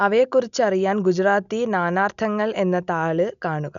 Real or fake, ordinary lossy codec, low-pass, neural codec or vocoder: real; none; 7.2 kHz; none